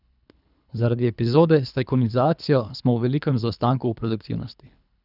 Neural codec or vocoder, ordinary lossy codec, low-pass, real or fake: codec, 24 kHz, 3 kbps, HILCodec; none; 5.4 kHz; fake